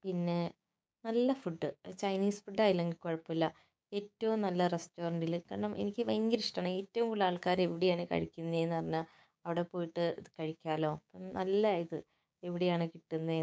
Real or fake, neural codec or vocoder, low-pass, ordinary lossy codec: fake; codec, 16 kHz, 6 kbps, DAC; none; none